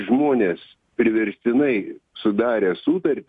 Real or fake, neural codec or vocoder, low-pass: real; none; 10.8 kHz